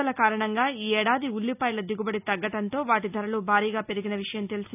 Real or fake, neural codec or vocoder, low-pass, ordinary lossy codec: real; none; 3.6 kHz; none